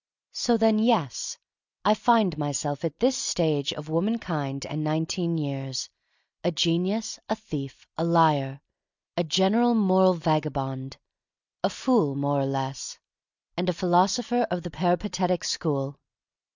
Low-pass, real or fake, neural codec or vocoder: 7.2 kHz; real; none